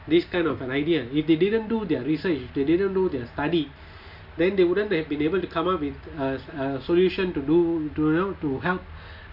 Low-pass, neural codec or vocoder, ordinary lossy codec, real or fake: 5.4 kHz; none; none; real